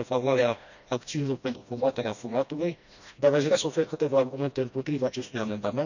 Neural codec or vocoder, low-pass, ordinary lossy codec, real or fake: codec, 16 kHz, 1 kbps, FreqCodec, smaller model; 7.2 kHz; none; fake